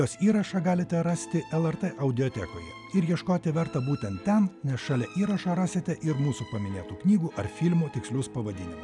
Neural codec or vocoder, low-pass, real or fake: none; 10.8 kHz; real